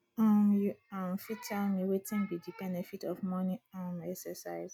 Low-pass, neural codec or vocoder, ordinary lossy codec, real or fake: 19.8 kHz; none; none; real